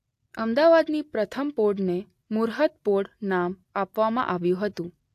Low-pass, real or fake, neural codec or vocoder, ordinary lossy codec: 14.4 kHz; real; none; AAC, 64 kbps